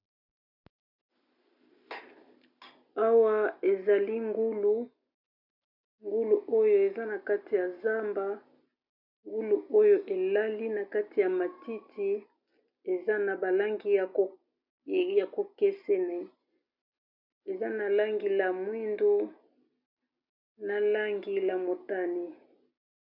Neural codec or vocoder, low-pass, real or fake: none; 5.4 kHz; real